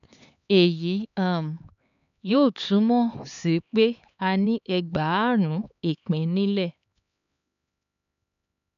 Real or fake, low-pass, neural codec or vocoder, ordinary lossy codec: fake; 7.2 kHz; codec, 16 kHz, 4 kbps, X-Codec, HuBERT features, trained on LibriSpeech; none